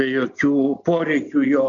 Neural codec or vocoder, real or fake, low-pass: none; real; 7.2 kHz